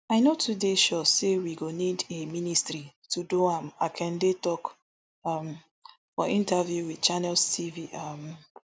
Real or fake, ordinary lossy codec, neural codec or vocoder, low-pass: real; none; none; none